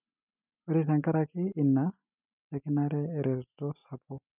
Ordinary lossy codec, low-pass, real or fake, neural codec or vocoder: none; 3.6 kHz; real; none